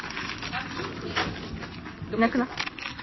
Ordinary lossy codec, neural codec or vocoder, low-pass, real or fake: MP3, 24 kbps; none; 7.2 kHz; real